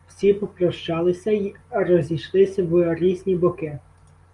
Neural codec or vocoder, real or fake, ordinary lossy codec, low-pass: none; real; Opus, 32 kbps; 10.8 kHz